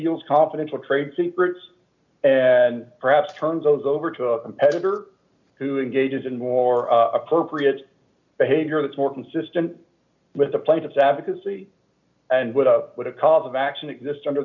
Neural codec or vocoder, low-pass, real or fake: none; 7.2 kHz; real